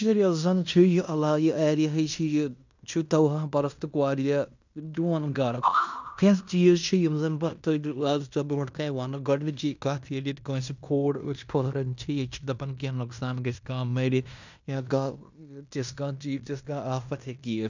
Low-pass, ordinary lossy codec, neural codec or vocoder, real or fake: 7.2 kHz; none; codec, 16 kHz in and 24 kHz out, 0.9 kbps, LongCat-Audio-Codec, fine tuned four codebook decoder; fake